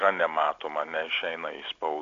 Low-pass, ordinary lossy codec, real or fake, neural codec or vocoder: 7.2 kHz; AAC, 64 kbps; real; none